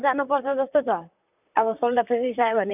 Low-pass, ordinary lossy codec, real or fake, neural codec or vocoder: 3.6 kHz; none; fake; vocoder, 44.1 kHz, 128 mel bands, Pupu-Vocoder